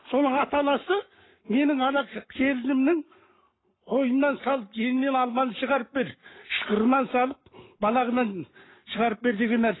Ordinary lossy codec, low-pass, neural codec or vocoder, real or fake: AAC, 16 kbps; 7.2 kHz; codec, 44.1 kHz, 7.8 kbps, Pupu-Codec; fake